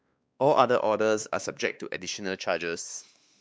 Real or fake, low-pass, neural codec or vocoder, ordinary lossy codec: fake; none; codec, 16 kHz, 2 kbps, X-Codec, WavLM features, trained on Multilingual LibriSpeech; none